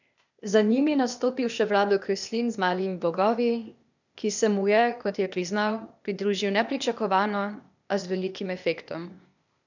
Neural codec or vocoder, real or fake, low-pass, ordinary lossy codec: codec, 16 kHz, 0.8 kbps, ZipCodec; fake; 7.2 kHz; none